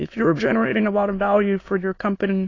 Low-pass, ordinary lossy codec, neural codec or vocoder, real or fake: 7.2 kHz; AAC, 32 kbps; autoencoder, 22.05 kHz, a latent of 192 numbers a frame, VITS, trained on many speakers; fake